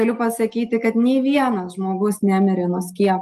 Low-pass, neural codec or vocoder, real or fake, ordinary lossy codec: 14.4 kHz; none; real; Opus, 32 kbps